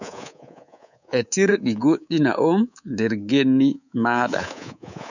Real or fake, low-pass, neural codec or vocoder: fake; 7.2 kHz; codec, 24 kHz, 3.1 kbps, DualCodec